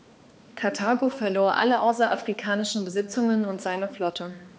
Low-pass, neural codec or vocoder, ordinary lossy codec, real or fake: none; codec, 16 kHz, 2 kbps, X-Codec, HuBERT features, trained on balanced general audio; none; fake